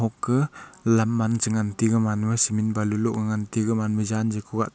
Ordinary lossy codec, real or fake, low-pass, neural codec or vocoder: none; real; none; none